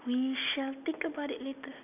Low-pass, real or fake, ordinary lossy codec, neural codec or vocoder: 3.6 kHz; real; none; none